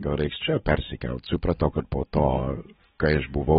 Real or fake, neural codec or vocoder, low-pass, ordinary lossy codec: real; none; 19.8 kHz; AAC, 16 kbps